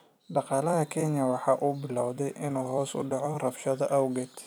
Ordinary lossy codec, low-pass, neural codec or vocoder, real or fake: none; none; vocoder, 44.1 kHz, 128 mel bands every 256 samples, BigVGAN v2; fake